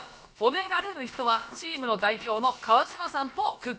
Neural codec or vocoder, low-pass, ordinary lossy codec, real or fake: codec, 16 kHz, about 1 kbps, DyCAST, with the encoder's durations; none; none; fake